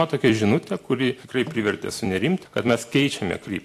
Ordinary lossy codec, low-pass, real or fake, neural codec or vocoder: AAC, 64 kbps; 14.4 kHz; fake; vocoder, 44.1 kHz, 128 mel bands every 256 samples, BigVGAN v2